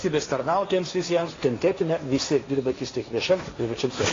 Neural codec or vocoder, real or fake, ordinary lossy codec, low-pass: codec, 16 kHz, 1.1 kbps, Voila-Tokenizer; fake; AAC, 32 kbps; 7.2 kHz